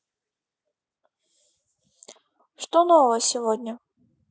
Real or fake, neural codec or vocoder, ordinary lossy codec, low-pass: real; none; none; none